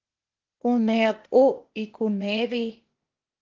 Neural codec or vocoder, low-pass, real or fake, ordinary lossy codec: codec, 16 kHz, 0.8 kbps, ZipCodec; 7.2 kHz; fake; Opus, 16 kbps